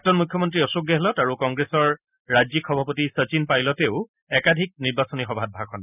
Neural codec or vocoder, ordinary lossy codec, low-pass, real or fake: none; none; 3.6 kHz; real